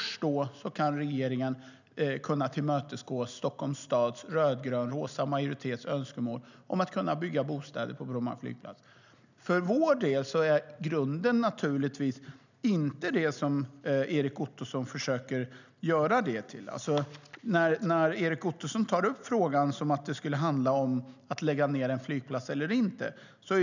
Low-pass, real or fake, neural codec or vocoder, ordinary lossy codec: 7.2 kHz; real; none; none